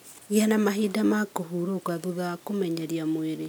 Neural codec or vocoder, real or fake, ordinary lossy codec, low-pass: none; real; none; none